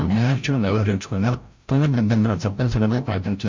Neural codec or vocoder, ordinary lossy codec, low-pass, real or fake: codec, 16 kHz, 0.5 kbps, FreqCodec, larger model; MP3, 48 kbps; 7.2 kHz; fake